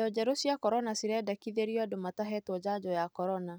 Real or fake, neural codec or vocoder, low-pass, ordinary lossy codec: real; none; none; none